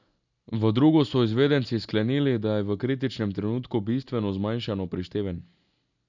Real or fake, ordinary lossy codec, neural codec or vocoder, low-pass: real; none; none; 7.2 kHz